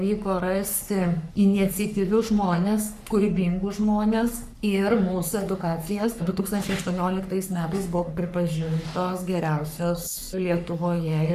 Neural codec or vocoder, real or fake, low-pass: codec, 44.1 kHz, 3.4 kbps, Pupu-Codec; fake; 14.4 kHz